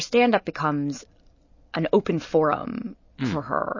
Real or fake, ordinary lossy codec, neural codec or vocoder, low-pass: real; MP3, 32 kbps; none; 7.2 kHz